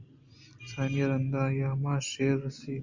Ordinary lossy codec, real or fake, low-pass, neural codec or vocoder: Opus, 64 kbps; real; 7.2 kHz; none